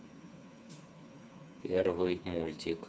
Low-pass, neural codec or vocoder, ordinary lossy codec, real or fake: none; codec, 16 kHz, 4 kbps, FreqCodec, smaller model; none; fake